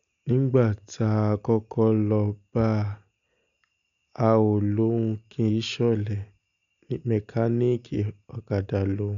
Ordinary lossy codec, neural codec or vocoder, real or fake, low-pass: none; none; real; 7.2 kHz